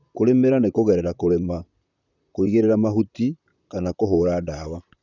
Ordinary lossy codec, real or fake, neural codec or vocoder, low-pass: none; fake; vocoder, 44.1 kHz, 128 mel bands, Pupu-Vocoder; 7.2 kHz